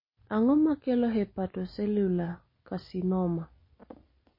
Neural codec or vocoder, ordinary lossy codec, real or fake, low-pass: none; MP3, 24 kbps; real; 5.4 kHz